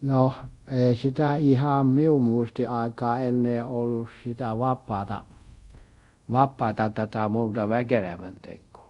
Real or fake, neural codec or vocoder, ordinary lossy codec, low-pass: fake; codec, 24 kHz, 0.5 kbps, DualCodec; Opus, 64 kbps; 10.8 kHz